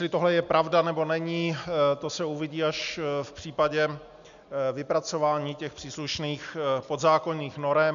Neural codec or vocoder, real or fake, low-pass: none; real; 7.2 kHz